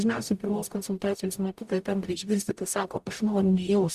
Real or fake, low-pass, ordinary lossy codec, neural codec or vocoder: fake; 14.4 kHz; Opus, 64 kbps; codec, 44.1 kHz, 0.9 kbps, DAC